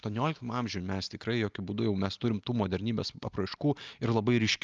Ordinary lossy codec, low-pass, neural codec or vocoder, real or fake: Opus, 24 kbps; 7.2 kHz; none; real